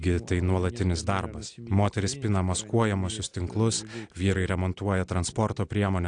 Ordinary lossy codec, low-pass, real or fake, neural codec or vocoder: AAC, 64 kbps; 9.9 kHz; real; none